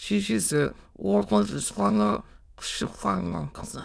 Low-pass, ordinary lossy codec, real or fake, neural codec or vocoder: none; none; fake; autoencoder, 22.05 kHz, a latent of 192 numbers a frame, VITS, trained on many speakers